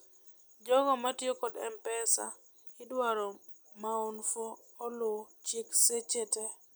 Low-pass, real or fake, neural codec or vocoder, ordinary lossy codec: none; real; none; none